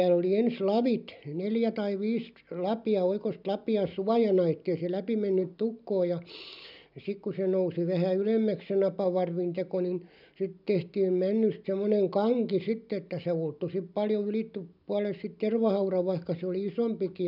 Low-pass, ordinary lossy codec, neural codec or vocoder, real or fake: 5.4 kHz; none; none; real